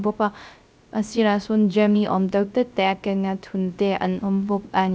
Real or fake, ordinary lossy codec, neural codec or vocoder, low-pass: fake; none; codec, 16 kHz, 0.3 kbps, FocalCodec; none